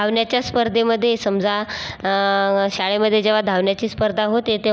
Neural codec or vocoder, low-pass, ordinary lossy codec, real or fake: none; none; none; real